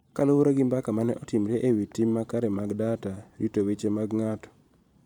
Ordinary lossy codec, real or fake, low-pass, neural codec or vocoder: none; fake; 19.8 kHz; vocoder, 44.1 kHz, 128 mel bands every 512 samples, BigVGAN v2